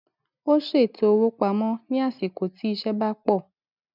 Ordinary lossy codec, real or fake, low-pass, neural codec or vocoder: none; real; 5.4 kHz; none